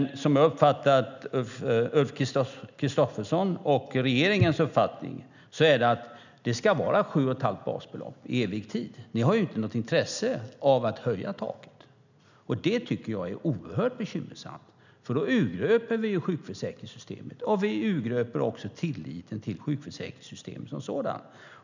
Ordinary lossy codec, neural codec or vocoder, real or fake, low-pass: none; none; real; 7.2 kHz